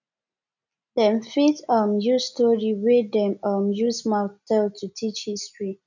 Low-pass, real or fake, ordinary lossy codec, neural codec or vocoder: 7.2 kHz; real; none; none